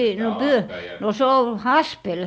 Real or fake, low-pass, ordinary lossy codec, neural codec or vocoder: real; none; none; none